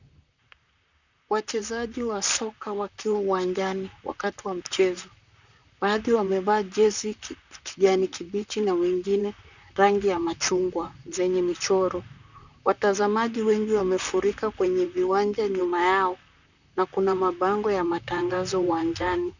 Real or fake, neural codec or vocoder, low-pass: fake; vocoder, 44.1 kHz, 128 mel bands, Pupu-Vocoder; 7.2 kHz